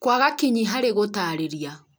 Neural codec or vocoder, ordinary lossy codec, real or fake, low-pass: none; none; real; none